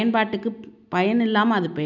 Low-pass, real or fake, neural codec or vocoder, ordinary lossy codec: 7.2 kHz; real; none; none